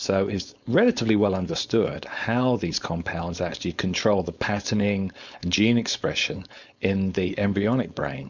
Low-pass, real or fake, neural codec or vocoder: 7.2 kHz; fake; codec, 16 kHz, 4.8 kbps, FACodec